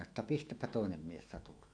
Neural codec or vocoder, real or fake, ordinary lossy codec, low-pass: autoencoder, 48 kHz, 128 numbers a frame, DAC-VAE, trained on Japanese speech; fake; AAC, 48 kbps; 9.9 kHz